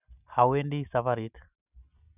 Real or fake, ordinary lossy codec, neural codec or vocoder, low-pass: real; none; none; 3.6 kHz